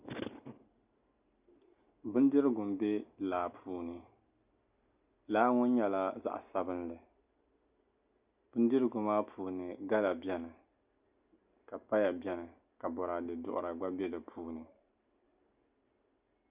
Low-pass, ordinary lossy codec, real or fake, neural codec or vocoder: 3.6 kHz; Opus, 64 kbps; real; none